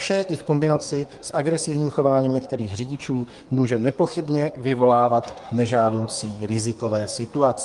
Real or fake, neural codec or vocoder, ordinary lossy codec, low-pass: fake; codec, 24 kHz, 1 kbps, SNAC; Opus, 32 kbps; 10.8 kHz